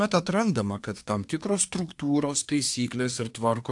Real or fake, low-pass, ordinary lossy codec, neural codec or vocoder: fake; 10.8 kHz; AAC, 64 kbps; codec, 24 kHz, 1 kbps, SNAC